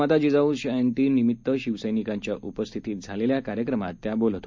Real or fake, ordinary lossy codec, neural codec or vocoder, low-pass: real; MP3, 64 kbps; none; 7.2 kHz